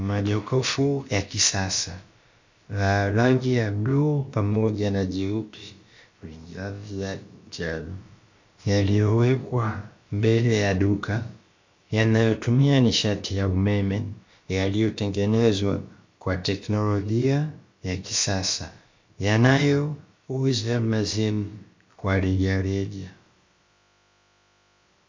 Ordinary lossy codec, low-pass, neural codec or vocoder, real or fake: MP3, 48 kbps; 7.2 kHz; codec, 16 kHz, about 1 kbps, DyCAST, with the encoder's durations; fake